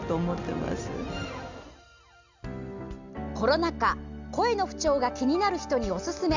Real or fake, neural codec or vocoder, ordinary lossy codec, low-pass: real; none; none; 7.2 kHz